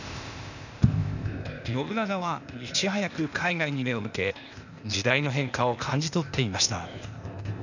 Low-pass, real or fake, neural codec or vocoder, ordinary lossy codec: 7.2 kHz; fake; codec, 16 kHz, 0.8 kbps, ZipCodec; none